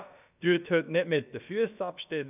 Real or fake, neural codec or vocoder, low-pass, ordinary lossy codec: fake; codec, 16 kHz, about 1 kbps, DyCAST, with the encoder's durations; 3.6 kHz; none